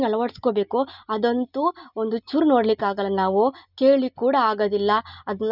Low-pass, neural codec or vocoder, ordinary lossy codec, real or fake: 5.4 kHz; none; none; real